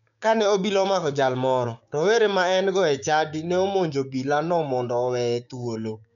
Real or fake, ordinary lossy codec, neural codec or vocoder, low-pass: fake; none; codec, 16 kHz, 6 kbps, DAC; 7.2 kHz